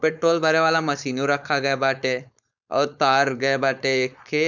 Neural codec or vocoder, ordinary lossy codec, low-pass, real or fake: codec, 16 kHz, 4.8 kbps, FACodec; none; 7.2 kHz; fake